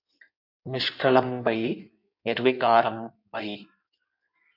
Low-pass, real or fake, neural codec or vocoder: 5.4 kHz; fake; codec, 16 kHz in and 24 kHz out, 1.1 kbps, FireRedTTS-2 codec